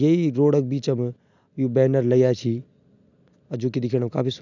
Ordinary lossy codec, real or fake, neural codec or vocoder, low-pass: none; real; none; 7.2 kHz